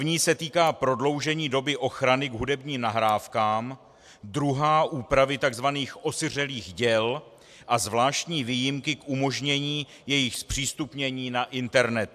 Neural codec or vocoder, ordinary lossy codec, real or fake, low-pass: none; AAC, 96 kbps; real; 14.4 kHz